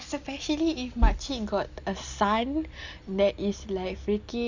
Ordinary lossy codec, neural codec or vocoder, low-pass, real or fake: Opus, 64 kbps; none; 7.2 kHz; real